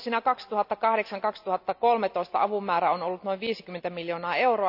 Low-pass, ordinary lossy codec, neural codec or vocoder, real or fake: 5.4 kHz; none; none; real